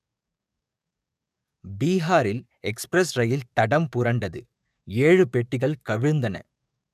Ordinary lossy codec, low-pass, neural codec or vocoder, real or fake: none; 14.4 kHz; codec, 44.1 kHz, 7.8 kbps, DAC; fake